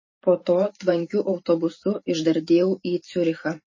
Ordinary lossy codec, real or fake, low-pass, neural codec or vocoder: MP3, 32 kbps; fake; 7.2 kHz; vocoder, 44.1 kHz, 128 mel bands every 512 samples, BigVGAN v2